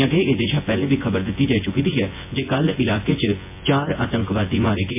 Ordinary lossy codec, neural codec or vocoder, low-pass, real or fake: MP3, 24 kbps; vocoder, 24 kHz, 100 mel bands, Vocos; 3.6 kHz; fake